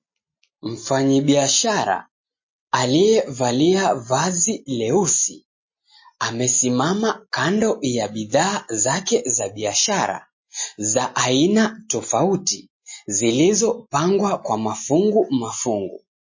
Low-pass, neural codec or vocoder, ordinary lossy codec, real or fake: 7.2 kHz; none; MP3, 32 kbps; real